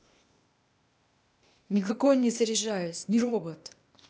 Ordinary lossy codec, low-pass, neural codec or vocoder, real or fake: none; none; codec, 16 kHz, 0.8 kbps, ZipCodec; fake